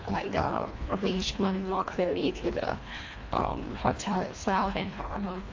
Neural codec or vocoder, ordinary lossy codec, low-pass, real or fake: codec, 24 kHz, 1.5 kbps, HILCodec; AAC, 48 kbps; 7.2 kHz; fake